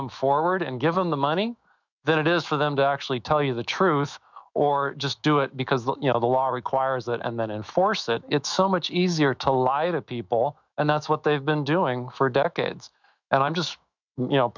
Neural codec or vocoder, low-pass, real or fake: autoencoder, 48 kHz, 128 numbers a frame, DAC-VAE, trained on Japanese speech; 7.2 kHz; fake